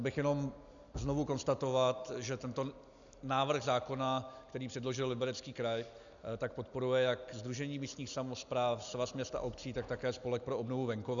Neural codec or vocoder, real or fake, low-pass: none; real; 7.2 kHz